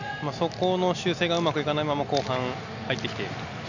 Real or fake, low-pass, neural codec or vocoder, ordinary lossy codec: real; 7.2 kHz; none; none